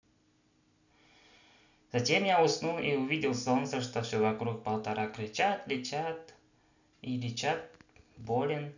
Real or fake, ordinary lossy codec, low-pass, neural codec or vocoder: real; none; 7.2 kHz; none